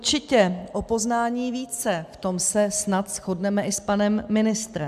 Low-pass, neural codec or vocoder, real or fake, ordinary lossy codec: 14.4 kHz; none; real; AAC, 96 kbps